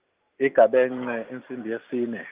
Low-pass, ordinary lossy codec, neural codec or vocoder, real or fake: 3.6 kHz; Opus, 32 kbps; none; real